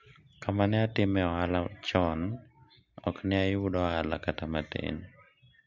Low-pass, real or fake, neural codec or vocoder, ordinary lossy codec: 7.2 kHz; real; none; MP3, 64 kbps